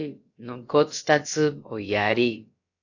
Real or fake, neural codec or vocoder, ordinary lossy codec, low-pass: fake; codec, 16 kHz, about 1 kbps, DyCAST, with the encoder's durations; AAC, 48 kbps; 7.2 kHz